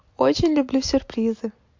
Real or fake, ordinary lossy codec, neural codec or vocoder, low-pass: real; MP3, 48 kbps; none; 7.2 kHz